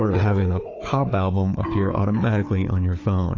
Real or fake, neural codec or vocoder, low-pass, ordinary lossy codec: fake; codec, 16 kHz, 4 kbps, FunCodec, trained on Chinese and English, 50 frames a second; 7.2 kHz; AAC, 32 kbps